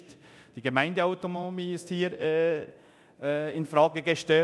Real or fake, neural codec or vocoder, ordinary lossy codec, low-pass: fake; codec, 24 kHz, 0.9 kbps, DualCodec; none; none